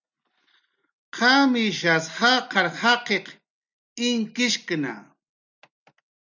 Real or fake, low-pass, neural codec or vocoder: real; 7.2 kHz; none